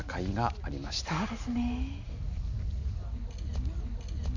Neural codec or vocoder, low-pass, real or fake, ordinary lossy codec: none; 7.2 kHz; real; none